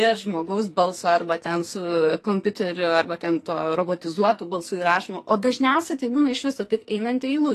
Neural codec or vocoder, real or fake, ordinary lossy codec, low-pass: codec, 44.1 kHz, 2.6 kbps, SNAC; fake; AAC, 48 kbps; 14.4 kHz